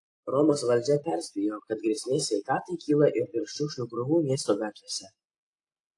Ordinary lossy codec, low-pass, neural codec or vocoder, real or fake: AAC, 48 kbps; 10.8 kHz; none; real